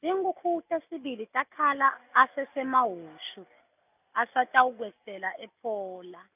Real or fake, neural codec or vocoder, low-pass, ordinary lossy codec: real; none; 3.6 kHz; none